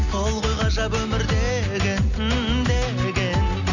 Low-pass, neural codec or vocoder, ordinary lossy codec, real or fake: 7.2 kHz; none; none; real